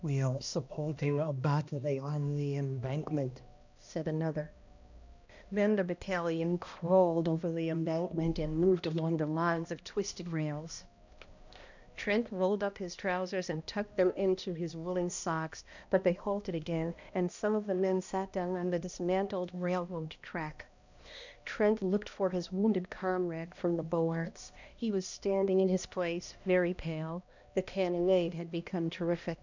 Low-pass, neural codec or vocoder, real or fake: 7.2 kHz; codec, 16 kHz, 1 kbps, X-Codec, HuBERT features, trained on balanced general audio; fake